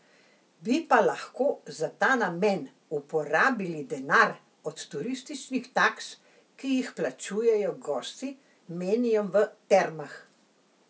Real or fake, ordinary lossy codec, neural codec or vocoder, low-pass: real; none; none; none